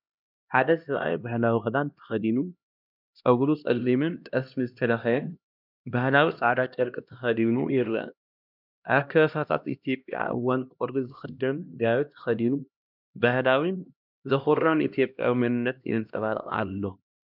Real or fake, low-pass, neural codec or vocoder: fake; 5.4 kHz; codec, 16 kHz, 1 kbps, X-Codec, HuBERT features, trained on LibriSpeech